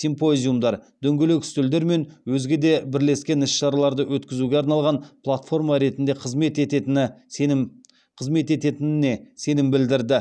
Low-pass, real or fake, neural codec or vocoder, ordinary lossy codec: none; real; none; none